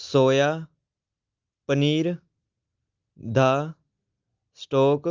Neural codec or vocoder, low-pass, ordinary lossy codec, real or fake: none; 7.2 kHz; Opus, 32 kbps; real